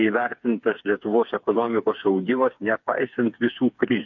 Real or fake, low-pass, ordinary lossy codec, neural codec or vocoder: fake; 7.2 kHz; MP3, 48 kbps; codec, 16 kHz, 4 kbps, FreqCodec, smaller model